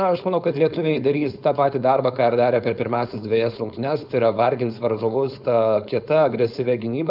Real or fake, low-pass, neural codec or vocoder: fake; 5.4 kHz; codec, 16 kHz, 4.8 kbps, FACodec